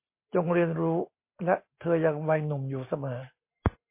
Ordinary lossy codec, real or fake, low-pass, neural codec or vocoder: MP3, 24 kbps; real; 3.6 kHz; none